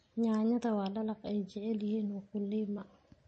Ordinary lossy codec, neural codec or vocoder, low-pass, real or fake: MP3, 32 kbps; none; 9.9 kHz; real